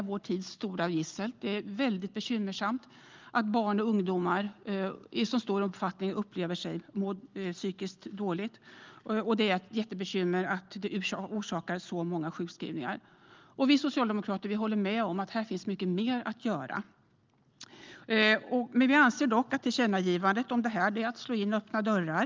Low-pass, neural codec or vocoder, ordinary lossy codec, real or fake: 7.2 kHz; none; Opus, 32 kbps; real